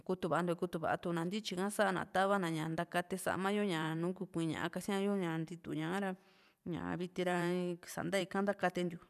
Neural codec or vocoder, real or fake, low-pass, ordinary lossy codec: vocoder, 44.1 kHz, 128 mel bands every 512 samples, BigVGAN v2; fake; 14.4 kHz; none